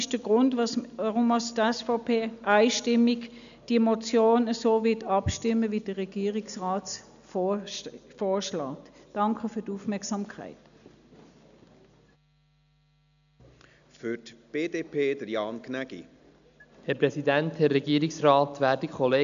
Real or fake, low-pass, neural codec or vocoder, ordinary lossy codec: real; 7.2 kHz; none; none